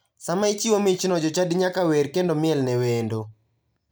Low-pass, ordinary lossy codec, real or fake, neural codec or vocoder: none; none; real; none